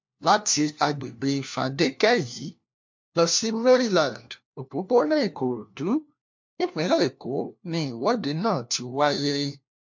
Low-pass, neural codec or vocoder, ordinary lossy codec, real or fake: 7.2 kHz; codec, 16 kHz, 1 kbps, FunCodec, trained on LibriTTS, 50 frames a second; MP3, 48 kbps; fake